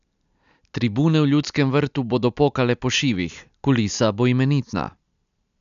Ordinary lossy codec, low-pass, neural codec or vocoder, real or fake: none; 7.2 kHz; none; real